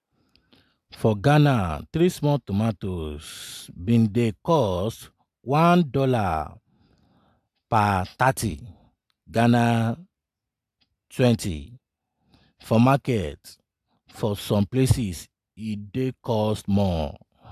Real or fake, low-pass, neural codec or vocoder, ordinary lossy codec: real; 14.4 kHz; none; AAC, 96 kbps